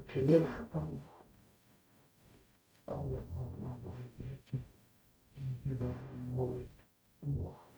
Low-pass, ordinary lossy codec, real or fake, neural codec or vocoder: none; none; fake; codec, 44.1 kHz, 0.9 kbps, DAC